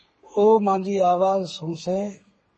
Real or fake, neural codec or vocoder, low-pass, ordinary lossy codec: fake; codec, 32 kHz, 1.9 kbps, SNAC; 10.8 kHz; MP3, 32 kbps